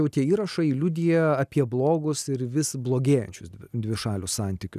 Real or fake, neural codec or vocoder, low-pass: real; none; 14.4 kHz